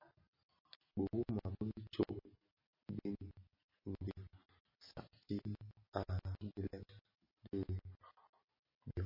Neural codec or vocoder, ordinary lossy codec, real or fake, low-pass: none; MP3, 24 kbps; real; 5.4 kHz